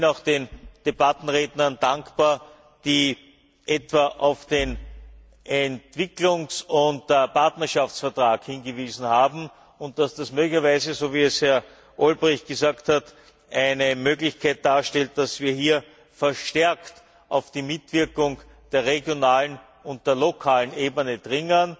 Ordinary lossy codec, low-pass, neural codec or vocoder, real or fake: none; none; none; real